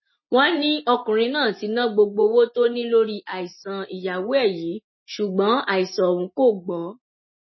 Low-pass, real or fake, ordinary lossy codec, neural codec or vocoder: 7.2 kHz; real; MP3, 24 kbps; none